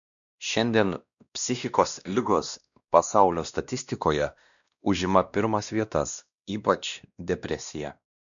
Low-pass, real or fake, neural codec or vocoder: 7.2 kHz; fake; codec, 16 kHz, 1 kbps, X-Codec, WavLM features, trained on Multilingual LibriSpeech